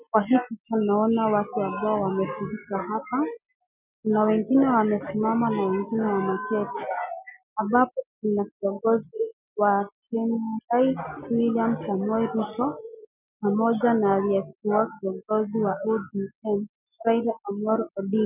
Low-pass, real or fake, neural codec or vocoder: 3.6 kHz; real; none